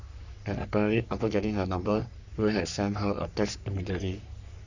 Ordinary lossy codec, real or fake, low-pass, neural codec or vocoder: none; fake; 7.2 kHz; codec, 44.1 kHz, 3.4 kbps, Pupu-Codec